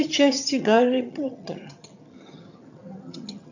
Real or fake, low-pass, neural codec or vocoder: fake; 7.2 kHz; vocoder, 22.05 kHz, 80 mel bands, HiFi-GAN